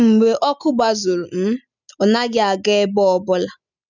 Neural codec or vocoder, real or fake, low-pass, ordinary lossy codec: none; real; 7.2 kHz; none